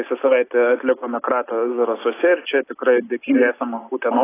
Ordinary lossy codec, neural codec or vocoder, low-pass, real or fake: AAC, 16 kbps; none; 3.6 kHz; real